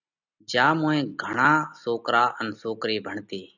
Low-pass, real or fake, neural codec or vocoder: 7.2 kHz; real; none